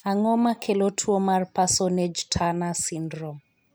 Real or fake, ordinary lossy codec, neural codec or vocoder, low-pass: real; none; none; none